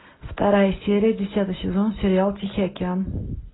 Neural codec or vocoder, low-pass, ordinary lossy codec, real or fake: none; 7.2 kHz; AAC, 16 kbps; real